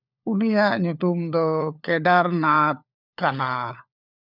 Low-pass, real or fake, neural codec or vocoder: 5.4 kHz; fake; codec, 16 kHz, 4 kbps, FunCodec, trained on LibriTTS, 50 frames a second